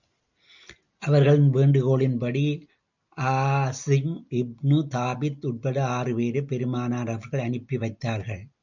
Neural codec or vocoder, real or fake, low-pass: none; real; 7.2 kHz